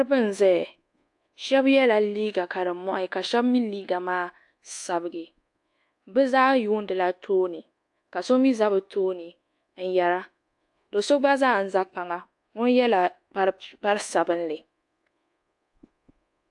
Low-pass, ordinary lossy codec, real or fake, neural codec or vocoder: 10.8 kHz; AAC, 64 kbps; fake; codec, 24 kHz, 1.2 kbps, DualCodec